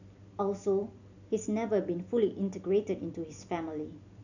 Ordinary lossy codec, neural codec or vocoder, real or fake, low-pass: none; none; real; 7.2 kHz